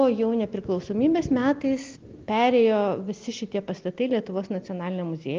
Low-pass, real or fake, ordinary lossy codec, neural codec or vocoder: 7.2 kHz; real; Opus, 32 kbps; none